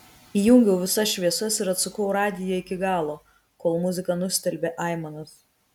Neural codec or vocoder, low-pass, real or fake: none; 19.8 kHz; real